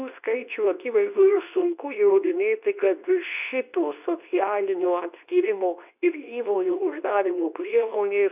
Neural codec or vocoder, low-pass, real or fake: codec, 24 kHz, 0.9 kbps, WavTokenizer, medium speech release version 2; 3.6 kHz; fake